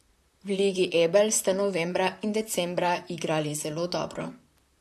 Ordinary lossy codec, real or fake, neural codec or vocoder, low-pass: AAC, 96 kbps; fake; vocoder, 44.1 kHz, 128 mel bands, Pupu-Vocoder; 14.4 kHz